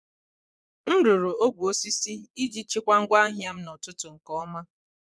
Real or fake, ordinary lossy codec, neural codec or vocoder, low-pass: fake; none; vocoder, 22.05 kHz, 80 mel bands, Vocos; none